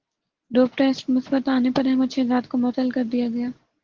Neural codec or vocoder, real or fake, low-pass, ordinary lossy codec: none; real; 7.2 kHz; Opus, 16 kbps